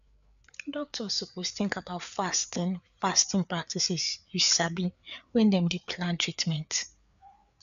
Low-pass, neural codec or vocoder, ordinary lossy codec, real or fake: 7.2 kHz; codec, 16 kHz, 4 kbps, FreqCodec, larger model; none; fake